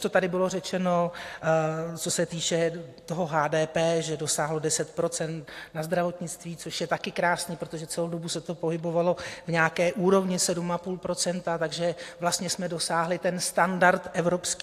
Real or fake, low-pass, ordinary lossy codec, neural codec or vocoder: real; 14.4 kHz; AAC, 64 kbps; none